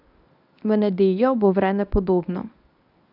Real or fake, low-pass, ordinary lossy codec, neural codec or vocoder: fake; 5.4 kHz; none; codec, 24 kHz, 0.9 kbps, WavTokenizer, medium speech release version 1